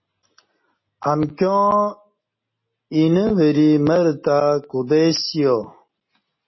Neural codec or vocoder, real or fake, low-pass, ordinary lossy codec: none; real; 7.2 kHz; MP3, 24 kbps